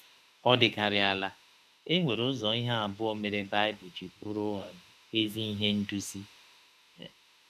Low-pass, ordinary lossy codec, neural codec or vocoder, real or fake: 14.4 kHz; MP3, 96 kbps; autoencoder, 48 kHz, 32 numbers a frame, DAC-VAE, trained on Japanese speech; fake